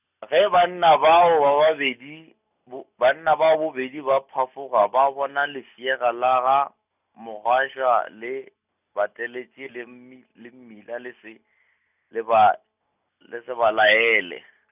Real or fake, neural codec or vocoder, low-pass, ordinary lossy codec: real; none; 3.6 kHz; none